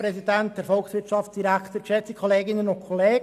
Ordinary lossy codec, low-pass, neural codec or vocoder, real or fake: none; 14.4 kHz; none; real